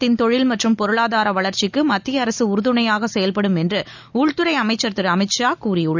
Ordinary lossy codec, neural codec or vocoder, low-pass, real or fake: none; none; 7.2 kHz; real